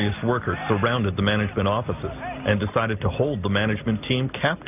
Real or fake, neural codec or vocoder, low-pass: real; none; 3.6 kHz